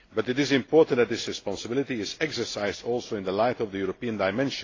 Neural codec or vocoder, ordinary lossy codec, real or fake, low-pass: none; AAC, 32 kbps; real; 7.2 kHz